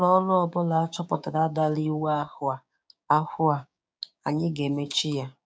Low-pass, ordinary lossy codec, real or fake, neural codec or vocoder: none; none; fake; codec, 16 kHz, 6 kbps, DAC